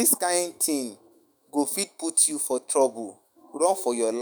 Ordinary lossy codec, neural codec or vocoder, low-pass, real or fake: none; autoencoder, 48 kHz, 128 numbers a frame, DAC-VAE, trained on Japanese speech; none; fake